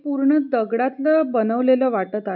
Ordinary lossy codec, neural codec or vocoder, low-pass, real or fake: none; none; 5.4 kHz; real